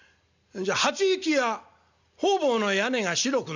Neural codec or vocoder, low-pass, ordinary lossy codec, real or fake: none; 7.2 kHz; none; real